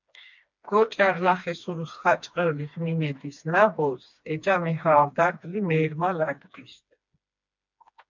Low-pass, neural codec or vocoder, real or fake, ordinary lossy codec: 7.2 kHz; codec, 16 kHz, 2 kbps, FreqCodec, smaller model; fake; MP3, 64 kbps